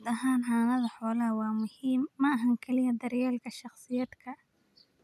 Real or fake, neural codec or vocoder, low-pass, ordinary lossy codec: real; none; 14.4 kHz; none